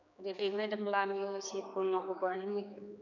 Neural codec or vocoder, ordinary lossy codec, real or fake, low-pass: codec, 16 kHz, 4 kbps, X-Codec, HuBERT features, trained on general audio; none; fake; 7.2 kHz